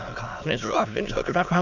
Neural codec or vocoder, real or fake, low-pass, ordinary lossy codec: autoencoder, 22.05 kHz, a latent of 192 numbers a frame, VITS, trained on many speakers; fake; 7.2 kHz; none